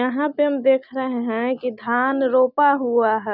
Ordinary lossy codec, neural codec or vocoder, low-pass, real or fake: none; none; 5.4 kHz; real